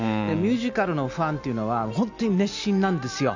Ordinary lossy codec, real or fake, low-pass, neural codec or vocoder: none; real; 7.2 kHz; none